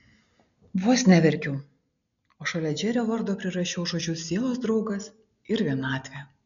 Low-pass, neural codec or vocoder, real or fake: 7.2 kHz; none; real